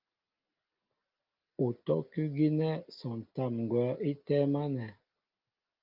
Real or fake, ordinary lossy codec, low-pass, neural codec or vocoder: real; Opus, 24 kbps; 5.4 kHz; none